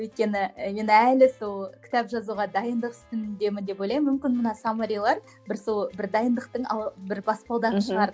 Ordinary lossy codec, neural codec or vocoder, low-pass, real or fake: none; none; none; real